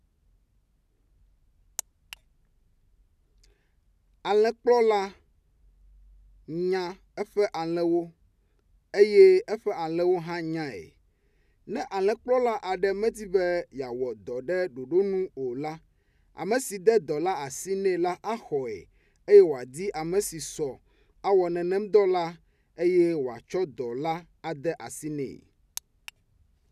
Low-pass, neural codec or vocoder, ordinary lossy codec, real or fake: 14.4 kHz; none; none; real